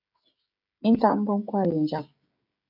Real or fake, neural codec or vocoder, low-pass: fake; codec, 16 kHz, 16 kbps, FreqCodec, smaller model; 5.4 kHz